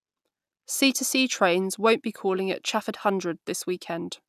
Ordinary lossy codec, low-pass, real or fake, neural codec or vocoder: none; 14.4 kHz; real; none